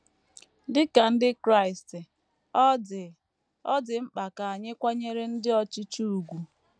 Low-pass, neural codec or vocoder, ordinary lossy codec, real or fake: 9.9 kHz; none; none; real